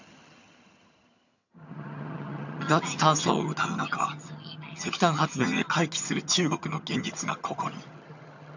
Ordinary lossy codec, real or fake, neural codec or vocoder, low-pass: none; fake; vocoder, 22.05 kHz, 80 mel bands, HiFi-GAN; 7.2 kHz